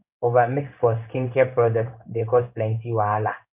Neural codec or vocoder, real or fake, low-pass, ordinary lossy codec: codec, 16 kHz in and 24 kHz out, 1 kbps, XY-Tokenizer; fake; 3.6 kHz; none